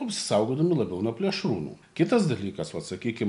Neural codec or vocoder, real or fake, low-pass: none; real; 10.8 kHz